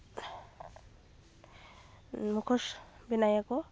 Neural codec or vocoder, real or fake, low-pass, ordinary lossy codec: none; real; none; none